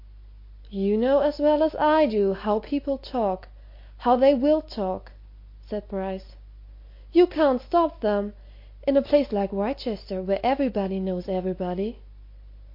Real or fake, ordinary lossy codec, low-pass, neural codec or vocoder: real; MP3, 32 kbps; 5.4 kHz; none